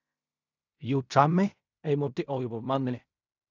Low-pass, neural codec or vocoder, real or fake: 7.2 kHz; codec, 16 kHz in and 24 kHz out, 0.4 kbps, LongCat-Audio-Codec, fine tuned four codebook decoder; fake